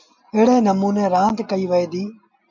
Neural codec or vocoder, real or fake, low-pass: none; real; 7.2 kHz